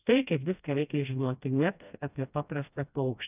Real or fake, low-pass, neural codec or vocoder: fake; 3.6 kHz; codec, 16 kHz, 1 kbps, FreqCodec, smaller model